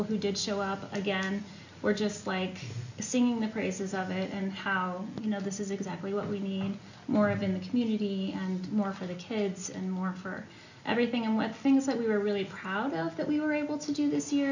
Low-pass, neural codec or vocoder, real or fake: 7.2 kHz; none; real